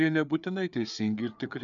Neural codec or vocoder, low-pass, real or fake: codec, 16 kHz, 4 kbps, FreqCodec, larger model; 7.2 kHz; fake